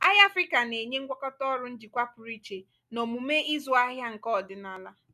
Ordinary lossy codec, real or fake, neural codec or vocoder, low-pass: none; real; none; 14.4 kHz